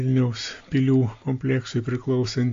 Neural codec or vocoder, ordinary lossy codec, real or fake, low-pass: none; AAC, 48 kbps; real; 7.2 kHz